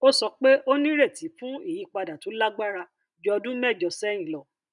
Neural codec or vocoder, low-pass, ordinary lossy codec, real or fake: none; 10.8 kHz; none; real